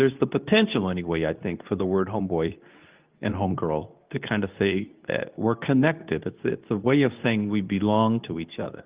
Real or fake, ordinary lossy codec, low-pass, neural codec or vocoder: fake; Opus, 32 kbps; 3.6 kHz; codec, 24 kHz, 0.9 kbps, WavTokenizer, medium speech release version 2